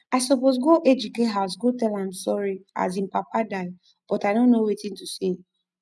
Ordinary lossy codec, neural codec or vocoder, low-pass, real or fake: none; none; none; real